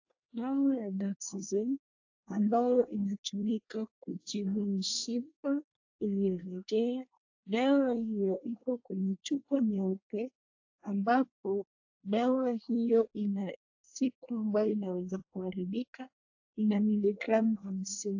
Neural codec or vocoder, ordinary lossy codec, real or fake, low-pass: codec, 24 kHz, 1 kbps, SNAC; AAC, 48 kbps; fake; 7.2 kHz